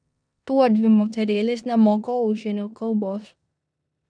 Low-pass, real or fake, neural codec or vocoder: 9.9 kHz; fake; codec, 16 kHz in and 24 kHz out, 0.9 kbps, LongCat-Audio-Codec, four codebook decoder